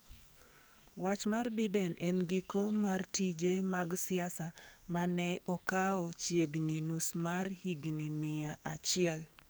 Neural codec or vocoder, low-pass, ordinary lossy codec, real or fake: codec, 44.1 kHz, 2.6 kbps, SNAC; none; none; fake